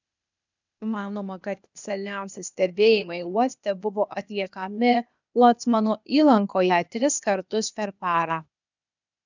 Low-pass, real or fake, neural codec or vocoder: 7.2 kHz; fake; codec, 16 kHz, 0.8 kbps, ZipCodec